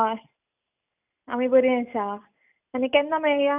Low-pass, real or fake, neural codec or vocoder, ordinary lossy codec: 3.6 kHz; real; none; none